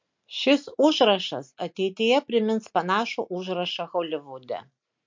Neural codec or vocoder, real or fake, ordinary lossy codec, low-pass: none; real; MP3, 48 kbps; 7.2 kHz